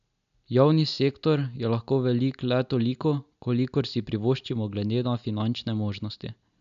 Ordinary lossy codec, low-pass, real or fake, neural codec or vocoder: none; 7.2 kHz; real; none